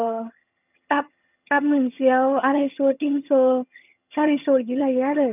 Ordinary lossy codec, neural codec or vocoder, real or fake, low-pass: none; vocoder, 22.05 kHz, 80 mel bands, HiFi-GAN; fake; 3.6 kHz